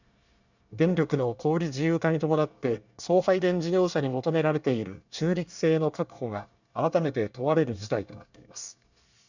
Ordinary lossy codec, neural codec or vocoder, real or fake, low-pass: none; codec, 24 kHz, 1 kbps, SNAC; fake; 7.2 kHz